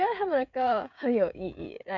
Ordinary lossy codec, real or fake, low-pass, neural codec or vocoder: none; fake; 7.2 kHz; codec, 16 kHz, 16 kbps, FreqCodec, smaller model